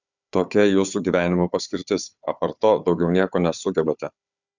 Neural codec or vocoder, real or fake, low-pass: codec, 16 kHz, 4 kbps, FunCodec, trained on Chinese and English, 50 frames a second; fake; 7.2 kHz